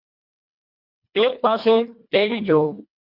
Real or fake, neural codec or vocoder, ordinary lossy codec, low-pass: fake; codec, 24 kHz, 1.5 kbps, HILCodec; MP3, 48 kbps; 5.4 kHz